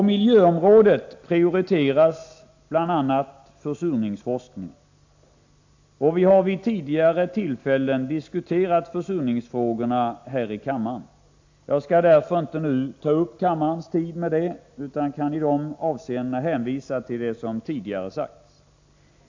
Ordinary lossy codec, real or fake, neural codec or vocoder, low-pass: AAC, 48 kbps; real; none; 7.2 kHz